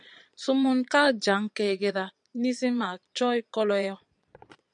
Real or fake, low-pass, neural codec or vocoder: fake; 9.9 kHz; vocoder, 22.05 kHz, 80 mel bands, Vocos